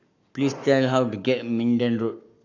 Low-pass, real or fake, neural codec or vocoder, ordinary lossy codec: 7.2 kHz; fake; codec, 44.1 kHz, 7.8 kbps, Pupu-Codec; none